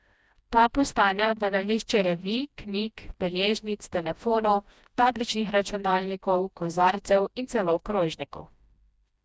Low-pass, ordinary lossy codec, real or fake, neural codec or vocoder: none; none; fake; codec, 16 kHz, 1 kbps, FreqCodec, smaller model